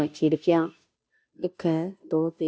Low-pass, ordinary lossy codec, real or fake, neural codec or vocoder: none; none; fake; codec, 16 kHz, 0.5 kbps, FunCodec, trained on Chinese and English, 25 frames a second